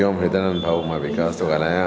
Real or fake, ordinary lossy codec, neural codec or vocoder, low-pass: real; none; none; none